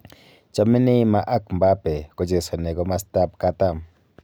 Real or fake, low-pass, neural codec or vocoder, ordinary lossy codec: real; none; none; none